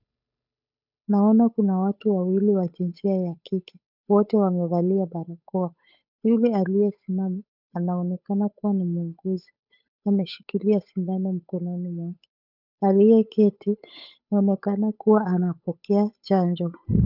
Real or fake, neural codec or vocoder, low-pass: fake; codec, 16 kHz, 8 kbps, FunCodec, trained on Chinese and English, 25 frames a second; 5.4 kHz